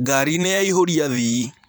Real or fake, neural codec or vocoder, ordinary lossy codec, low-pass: fake; vocoder, 44.1 kHz, 128 mel bands every 512 samples, BigVGAN v2; none; none